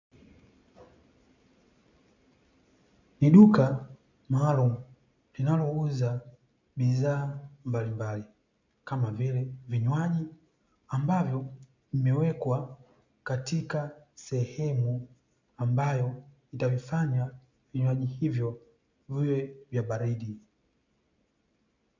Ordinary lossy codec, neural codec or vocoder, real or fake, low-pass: MP3, 64 kbps; none; real; 7.2 kHz